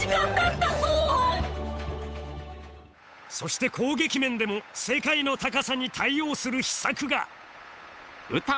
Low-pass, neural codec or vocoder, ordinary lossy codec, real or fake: none; codec, 16 kHz, 8 kbps, FunCodec, trained on Chinese and English, 25 frames a second; none; fake